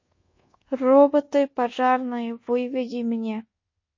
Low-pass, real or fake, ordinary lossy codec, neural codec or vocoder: 7.2 kHz; fake; MP3, 32 kbps; codec, 24 kHz, 1.2 kbps, DualCodec